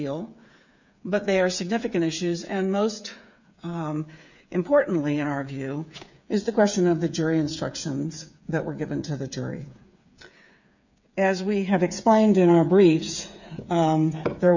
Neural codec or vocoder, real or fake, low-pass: codec, 16 kHz, 8 kbps, FreqCodec, smaller model; fake; 7.2 kHz